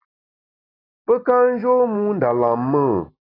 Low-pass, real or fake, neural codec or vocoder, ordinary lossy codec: 5.4 kHz; real; none; AAC, 24 kbps